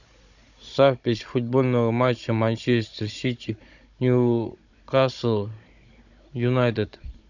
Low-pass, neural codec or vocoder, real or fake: 7.2 kHz; codec, 16 kHz, 16 kbps, FunCodec, trained on Chinese and English, 50 frames a second; fake